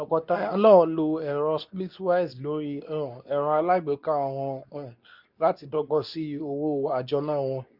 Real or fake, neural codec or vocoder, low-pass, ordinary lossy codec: fake; codec, 24 kHz, 0.9 kbps, WavTokenizer, medium speech release version 2; 5.4 kHz; none